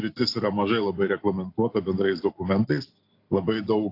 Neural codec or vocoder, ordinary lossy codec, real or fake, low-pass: none; AAC, 32 kbps; real; 5.4 kHz